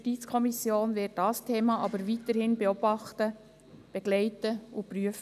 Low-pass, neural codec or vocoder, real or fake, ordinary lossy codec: 14.4 kHz; none; real; none